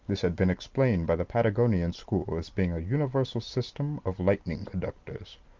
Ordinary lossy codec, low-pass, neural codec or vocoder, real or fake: Opus, 32 kbps; 7.2 kHz; none; real